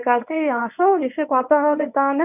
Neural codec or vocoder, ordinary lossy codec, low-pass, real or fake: codec, 24 kHz, 0.9 kbps, WavTokenizer, medium speech release version 1; none; 3.6 kHz; fake